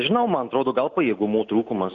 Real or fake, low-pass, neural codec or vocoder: real; 7.2 kHz; none